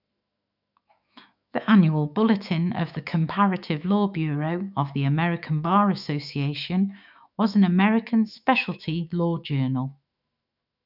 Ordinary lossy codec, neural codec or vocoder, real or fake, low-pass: none; autoencoder, 48 kHz, 128 numbers a frame, DAC-VAE, trained on Japanese speech; fake; 5.4 kHz